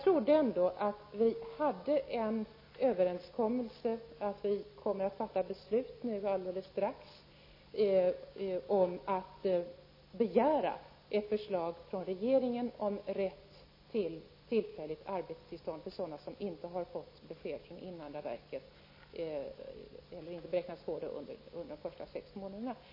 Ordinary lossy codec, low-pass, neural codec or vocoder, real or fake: MP3, 24 kbps; 5.4 kHz; none; real